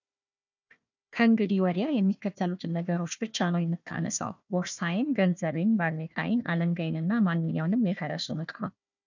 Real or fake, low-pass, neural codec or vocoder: fake; 7.2 kHz; codec, 16 kHz, 1 kbps, FunCodec, trained on Chinese and English, 50 frames a second